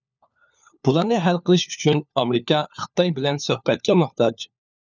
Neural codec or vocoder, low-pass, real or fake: codec, 16 kHz, 4 kbps, FunCodec, trained on LibriTTS, 50 frames a second; 7.2 kHz; fake